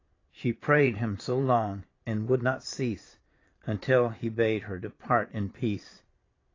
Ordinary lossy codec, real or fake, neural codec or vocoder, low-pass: AAC, 32 kbps; fake; vocoder, 22.05 kHz, 80 mel bands, Vocos; 7.2 kHz